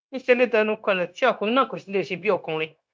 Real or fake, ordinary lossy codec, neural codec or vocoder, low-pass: fake; none; codec, 16 kHz, 0.9 kbps, LongCat-Audio-Codec; none